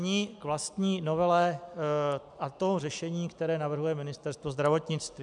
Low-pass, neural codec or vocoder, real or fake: 10.8 kHz; none; real